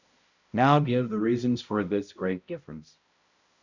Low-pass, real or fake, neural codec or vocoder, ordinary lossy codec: 7.2 kHz; fake; codec, 16 kHz, 0.5 kbps, X-Codec, HuBERT features, trained on balanced general audio; Opus, 64 kbps